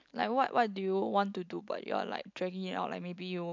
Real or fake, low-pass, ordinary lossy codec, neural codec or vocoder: real; 7.2 kHz; MP3, 64 kbps; none